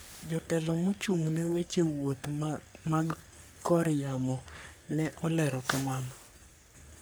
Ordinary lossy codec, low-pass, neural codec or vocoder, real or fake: none; none; codec, 44.1 kHz, 3.4 kbps, Pupu-Codec; fake